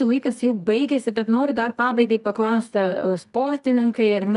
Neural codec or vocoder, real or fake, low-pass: codec, 24 kHz, 0.9 kbps, WavTokenizer, medium music audio release; fake; 10.8 kHz